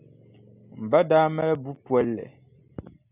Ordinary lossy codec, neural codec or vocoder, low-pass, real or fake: AAC, 32 kbps; none; 3.6 kHz; real